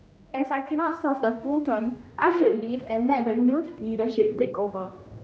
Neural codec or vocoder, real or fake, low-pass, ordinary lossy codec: codec, 16 kHz, 1 kbps, X-Codec, HuBERT features, trained on general audio; fake; none; none